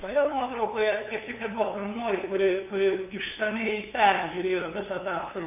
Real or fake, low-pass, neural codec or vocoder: fake; 3.6 kHz; codec, 16 kHz, 2 kbps, FunCodec, trained on LibriTTS, 25 frames a second